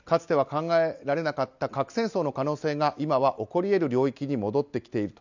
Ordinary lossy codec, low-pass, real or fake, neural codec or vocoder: none; 7.2 kHz; real; none